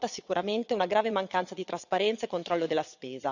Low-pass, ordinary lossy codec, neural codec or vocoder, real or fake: 7.2 kHz; none; vocoder, 22.05 kHz, 80 mel bands, WaveNeXt; fake